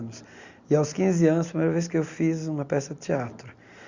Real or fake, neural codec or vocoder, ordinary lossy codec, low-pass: real; none; Opus, 64 kbps; 7.2 kHz